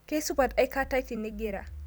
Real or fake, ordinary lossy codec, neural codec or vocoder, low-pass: fake; none; vocoder, 44.1 kHz, 128 mel bands every 256 samples, BigVGAN v2; none